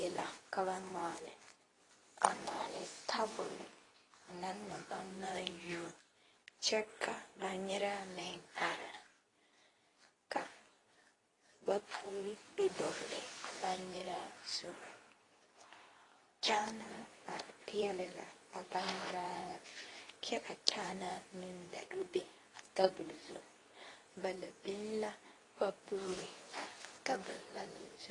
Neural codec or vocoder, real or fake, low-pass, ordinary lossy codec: codec, 24 kHz, 0.9 kbps, WavTokenizer, medium speech release version 2; fake; 10.8 kHz; AAC, 32 kbps